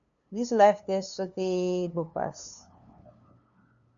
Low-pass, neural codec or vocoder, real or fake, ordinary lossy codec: 7.2 kHz; codec, 16 kHz, 2 kbps, FunCodec, trained on LibriTTS, 25 frames a second; fake; MP3, 96 kbps